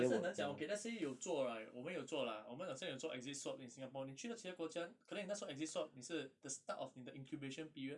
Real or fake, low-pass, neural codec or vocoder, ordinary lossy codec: real; 10.8 kHz; none; none